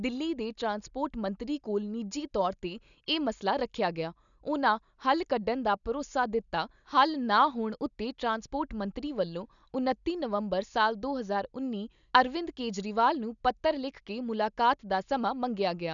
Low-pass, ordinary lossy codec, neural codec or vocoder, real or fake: 7.2 kHz; none; none; real